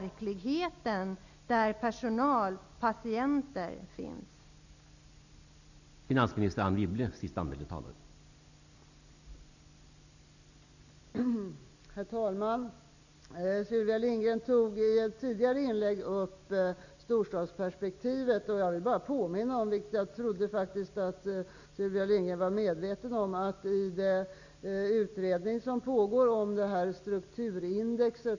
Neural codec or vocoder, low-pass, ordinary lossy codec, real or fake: none; 7.2 kHz; none; real